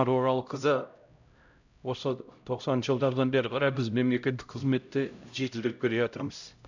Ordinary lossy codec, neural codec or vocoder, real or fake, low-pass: none; codec, 16 kHz, 0.5 kbps, X-Codec, HuBERT features, trained on LibriSpeech; fake; 7.2 kHz